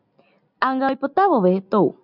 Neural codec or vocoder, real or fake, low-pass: none; real; 5.4 kHz